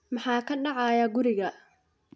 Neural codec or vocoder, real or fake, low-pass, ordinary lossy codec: none; real; none; none